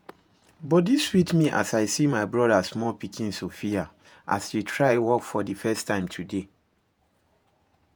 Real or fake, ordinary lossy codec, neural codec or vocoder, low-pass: fake; none; vocoder, 48 kHz, 128 mel bands, Vocos; none